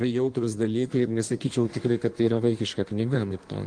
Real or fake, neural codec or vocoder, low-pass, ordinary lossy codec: fake; codec, 16 kHz in and 24 kHz out, 1.1 kbps, FireRedTTS-2 codec; 9.9 kHz; Opus, 24 kbps